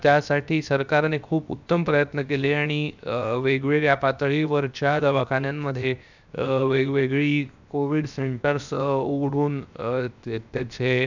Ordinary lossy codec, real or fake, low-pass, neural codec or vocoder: none; fake; 7.2 kHz; codec, 16 kHz, 0.7 kbps, FocalCodec